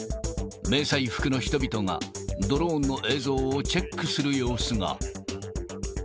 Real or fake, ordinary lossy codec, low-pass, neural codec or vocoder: real; none; none; none